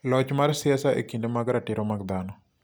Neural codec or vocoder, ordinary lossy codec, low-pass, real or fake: none; none; none; real